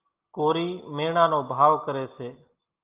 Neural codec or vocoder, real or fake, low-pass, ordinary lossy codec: none; real; 3.6 kHz; Opus, 24 kbps